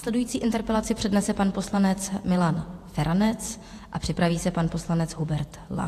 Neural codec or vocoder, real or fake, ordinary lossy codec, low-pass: vocoder, 48 kHz, 128 mel bands, Vocos; fake; AAC, 64 kbps; 14.4 kHz